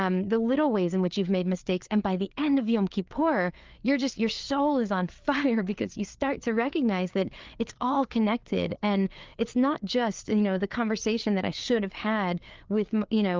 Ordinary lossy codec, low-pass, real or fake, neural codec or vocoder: Opus, 32 kbps; 7.2 kHz; fake; codec, 16 kHz, 4 kbps, FreqCodec, larger model